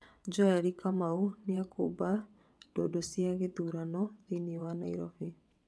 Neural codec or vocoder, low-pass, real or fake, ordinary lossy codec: vocoder, 22.05 kHz, 80 mel bands, WaveNeXt; none; fake; none